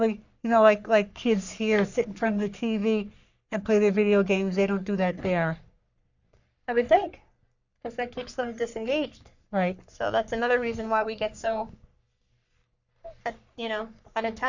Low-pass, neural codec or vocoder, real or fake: 7.2 kHz; codec, 44.1 kHz, 3.4 kbps, Pupu-Codec; fake